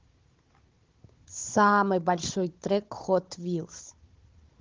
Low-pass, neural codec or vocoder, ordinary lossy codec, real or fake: 7.2 kHz; codec, 16 kHz, 16 kbps, FunCodec, trained on Chinese and English, 50 frames a second; Opus, 16 kbps; fake